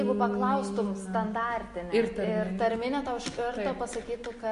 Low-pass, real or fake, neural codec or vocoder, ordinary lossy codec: 14.4 kHz; real; none; MP3, 48 kbps